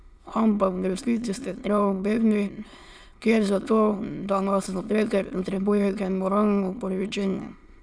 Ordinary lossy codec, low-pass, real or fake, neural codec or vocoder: none; none; fake; autoencoder, 22.05 kHz, a latent of 192 numbers a frame, VITS, trained on many speakers